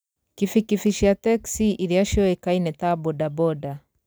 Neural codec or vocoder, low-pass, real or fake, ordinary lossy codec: none; none; real; none